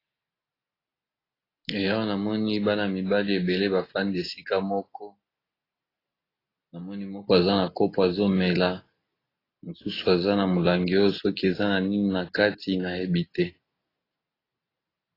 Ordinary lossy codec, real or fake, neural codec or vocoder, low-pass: AAC, 24 kbps; real; none; 5.4 kHz